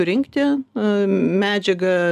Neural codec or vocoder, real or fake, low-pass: none; real; 14.4 kHz